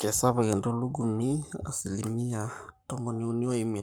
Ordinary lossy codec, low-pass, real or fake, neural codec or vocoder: none; none; fake; codec, 44.1 kHz, 7.8 kbps, Pupu-Codec